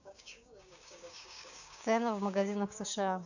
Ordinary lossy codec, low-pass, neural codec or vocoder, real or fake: none; 7.2 kHz; codec, 16 kHz, 6 kbps, DAC; fake